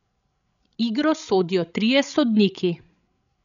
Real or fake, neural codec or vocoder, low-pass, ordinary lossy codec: fake; codec, 16 kHz, 16 kbps, FreqCodec, larger model; 7.2 kHz; none